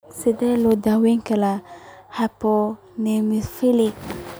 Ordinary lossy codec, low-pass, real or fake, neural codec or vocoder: none; none; real; none